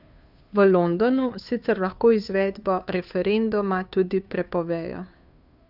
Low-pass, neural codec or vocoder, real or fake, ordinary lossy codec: 5.4 kHz; codec, 16 kHz, 2 kbps, FunCodec, trained on Chinese and English, 25 frames a second; fake; none